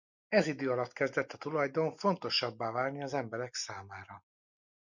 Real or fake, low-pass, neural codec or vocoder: real; 7.2 kHz; none